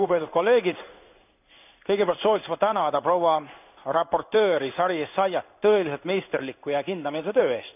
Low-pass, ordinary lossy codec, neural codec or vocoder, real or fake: 3.6 kHz; none; codec, 16 kHz in and 24 kHz out, 1 kbps, XY-Tokenizer; fake